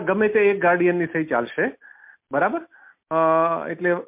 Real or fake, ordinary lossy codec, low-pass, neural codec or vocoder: real; MP3, 32 kbps; 3.6 kHz; none